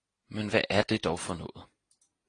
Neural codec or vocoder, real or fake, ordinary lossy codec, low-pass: none; real; AAC, 32 kbps; 9.9 kHz